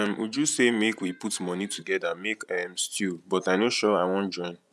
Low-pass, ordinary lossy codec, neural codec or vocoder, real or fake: none; none; vocoder, 24 kHz, 100 mel bands, Vocos; fake